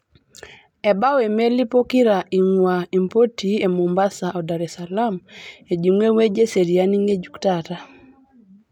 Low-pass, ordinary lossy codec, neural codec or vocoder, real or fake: 19.8 kHz; none; none; real